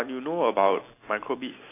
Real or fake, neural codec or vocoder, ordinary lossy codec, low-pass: real; none; AAC, 24 kbps; 3.6 kHz